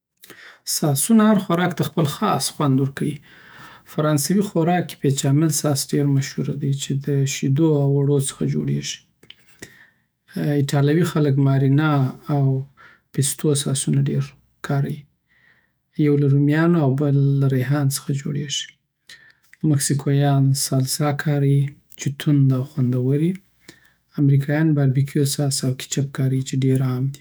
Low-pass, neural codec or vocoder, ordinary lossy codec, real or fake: none; autoencoder, 48 kHz, 128 numbers a frame, DAC-VAE, trained on Japanese speech; none; fake